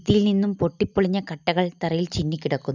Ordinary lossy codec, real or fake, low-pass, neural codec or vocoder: none; real; 7.2 kHz; none